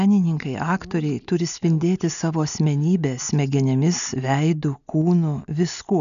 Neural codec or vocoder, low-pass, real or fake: none; 7.2 kHz; real